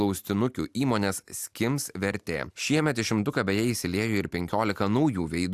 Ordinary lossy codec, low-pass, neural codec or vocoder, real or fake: AAC, 96 kbps; 14.4 kHz; none; real